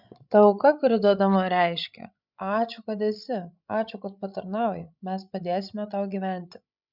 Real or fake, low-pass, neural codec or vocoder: fake; 5.4 kHz; codec, 16 kHz, 16 kbps, FreqCodec, larger model